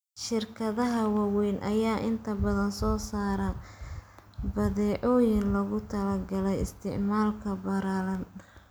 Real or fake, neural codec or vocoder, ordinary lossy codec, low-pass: real; none; none; none